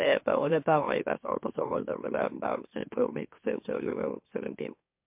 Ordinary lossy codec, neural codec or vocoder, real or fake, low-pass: MP3, 32 kbps; autoencoder, 44.1 kHz, a latent of 192 numbers a frame, MeloTTS; fake; 3.6 kHz